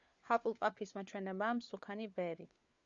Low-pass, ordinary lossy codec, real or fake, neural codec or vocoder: 7.2 kHz; Opus, 64 kbps; fake; codec, 16 kHz, 4 kbps, FunCodec, trained on LibriTTS, 50 frames a second